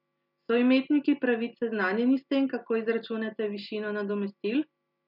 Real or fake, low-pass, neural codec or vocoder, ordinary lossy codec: real; 5.4 kHz; none; none